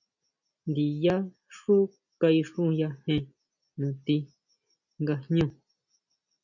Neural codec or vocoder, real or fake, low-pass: none; real; 7.2 kHz